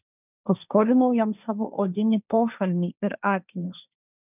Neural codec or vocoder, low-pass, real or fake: codec, 16 kHz, 1.1 kbps, Voila-Tokenizer; 3.6 kHz; fake